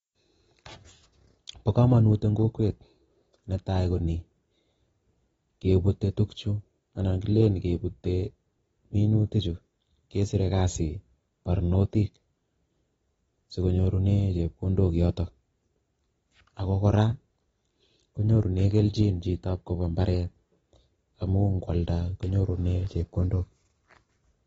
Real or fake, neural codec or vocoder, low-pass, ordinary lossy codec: real; none; 19.8 kHz; AAC, 24 kbps